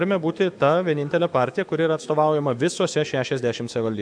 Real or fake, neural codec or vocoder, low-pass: fake; autoencoder, 48 kHz, 128 numbers a frame, DAC-VAE, trained on Japanese speech; 9.9 kHz